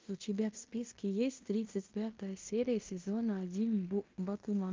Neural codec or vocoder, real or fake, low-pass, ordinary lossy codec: codec, 16 kHz in and 24 kHz out, 0.9 kbps, LongCat-Audio-Codec, fine tuned four codebook decoder; fake; 7.2 kHz; Opus, 32 kbps